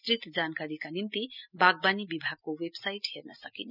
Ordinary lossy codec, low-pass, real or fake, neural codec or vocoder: none; 5.4 kHz; real; none